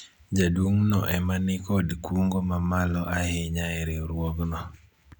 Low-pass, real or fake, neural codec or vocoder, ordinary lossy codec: 19.8 kHz; real; none; none